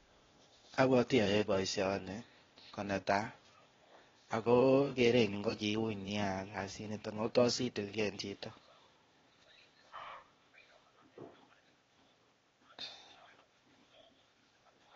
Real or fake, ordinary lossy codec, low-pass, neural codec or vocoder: fake; AAC, 24 kbps; 7.2 kHz; codec, 16 kHz, 0.8 kbps, ZipCodec